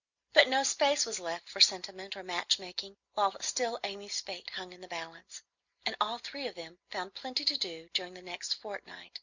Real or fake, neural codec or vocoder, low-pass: real; none; 7.2 kHz